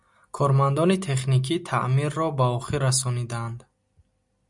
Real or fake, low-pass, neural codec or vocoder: real; 10.8 kHz; none